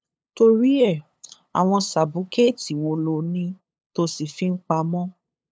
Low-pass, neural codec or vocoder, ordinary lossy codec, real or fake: none; codec, 16 kHz, 8 kbps, FunCodec, trained on LibriTTS, 25 frames a second; none; fake